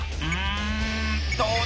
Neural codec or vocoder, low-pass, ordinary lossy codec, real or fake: none; none; none; real